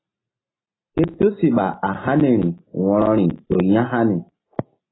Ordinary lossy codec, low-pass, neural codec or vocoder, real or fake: AAC, 16 kbps; 7.2 kHz; none; real